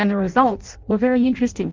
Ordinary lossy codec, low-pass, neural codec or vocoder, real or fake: Opus, 24 kbps; 7.2 kHz; codec, 16 kHz in and 24 kHz out, 0.6 kbps, FireRedTTS-2 codec; fake